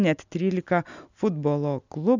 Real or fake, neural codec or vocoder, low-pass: real; none; 7.2 kHz